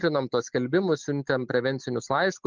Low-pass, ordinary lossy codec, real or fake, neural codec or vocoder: 7.2 kHz; Opus, 24 kbps; real; none